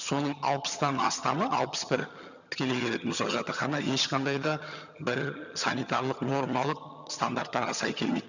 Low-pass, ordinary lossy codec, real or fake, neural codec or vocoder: 7.2 kHz; none; fake; vocoder, 22.05 kHz, 80 mel bands, HiFi-GAN